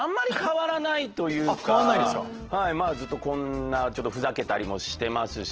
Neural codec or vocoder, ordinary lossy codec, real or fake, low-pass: none; Opus, 16 kbps; real; 7.2 kHz